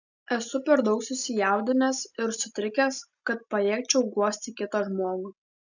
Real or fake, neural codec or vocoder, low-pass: real; none; 7.2 kHz